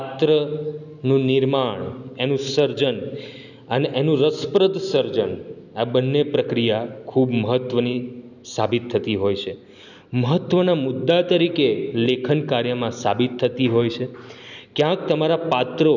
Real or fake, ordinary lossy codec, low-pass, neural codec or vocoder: real; none; 7.2 kHz; none